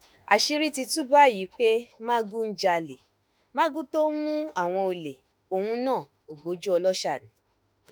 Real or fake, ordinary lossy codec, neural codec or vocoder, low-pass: fake; none; autoencoder, 48 kHz, 32 numbers a frame, DAC-VAE, trained on Japanese speech; none